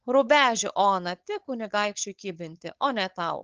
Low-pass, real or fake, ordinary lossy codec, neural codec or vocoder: 7.2 kHz; fake; Opus, 16 kbps; codec, 16 kHz, 4.8 kbps, FACodec